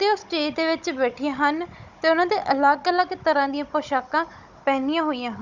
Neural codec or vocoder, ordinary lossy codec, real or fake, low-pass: codec, 16 kHz, 16 kbps, FunCodec, trained on Chinese and English, 50 frames a second; none; fake; 7.2 kHz